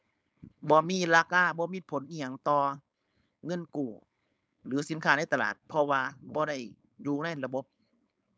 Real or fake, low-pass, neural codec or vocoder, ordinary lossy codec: fake; none; codec, 16 kHz, 4.8 kbps, FACodec; none